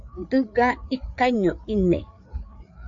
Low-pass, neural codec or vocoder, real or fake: 7.2 kHz; codec, 16 kHz, 4 kbps, FreqCodec, larger model; fake